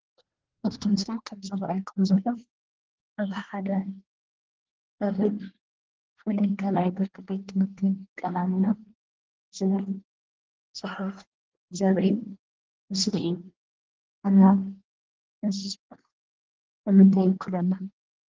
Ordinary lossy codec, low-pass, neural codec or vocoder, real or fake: Opus, 16 kbps; 7.2 kHz; codec, 24 kHz, 1 kbps, SNAC; fake